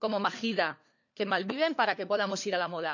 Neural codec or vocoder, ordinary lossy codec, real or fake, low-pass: codec, 16 kHz, 4 kbps, FunCodec, trained on LibriTTS, 50 frames a second; none; fake; 7.2 kHz